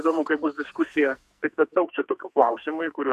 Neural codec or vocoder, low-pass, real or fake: codec, 44.1 kHz, 2.6 kbps, SNAC; 14.4 kHz; fake